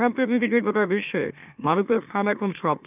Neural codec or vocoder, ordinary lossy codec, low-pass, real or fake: autoencoder, 44.1 kHz, a latent of 192 numbers a frame, MeloTTS; none; 3.6 kHz; fake